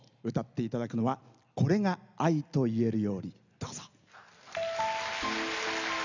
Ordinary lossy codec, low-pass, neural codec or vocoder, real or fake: none; 7.2 kHz; none; real